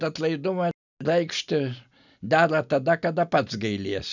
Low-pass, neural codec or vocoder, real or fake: 7.2 kHz; none; real